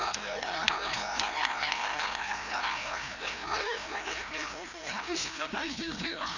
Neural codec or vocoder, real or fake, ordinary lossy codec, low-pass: codec, 16 kHz, 1 kbps, FreqCodec, larger model; fake; none; 7.2 kHz